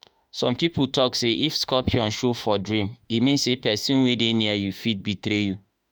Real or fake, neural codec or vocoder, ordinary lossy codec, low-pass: fake; autoencoder, 48 kHz, 32 numbers a frame, DAC-VAE, trained on Japanese speech; none; none